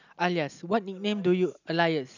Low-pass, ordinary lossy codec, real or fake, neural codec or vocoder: 7.2 kHz; none; real; none